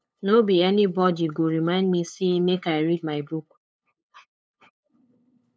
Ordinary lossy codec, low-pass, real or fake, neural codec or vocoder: none; none; fake; codec, 16 kHz, 8 kbps, FunCodec, trained on LibriTTS, 25 frames a second